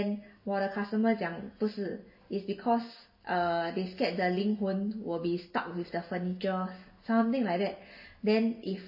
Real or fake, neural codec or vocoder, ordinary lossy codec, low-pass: real; none; MP3, 24 kbps; 5.4 kHz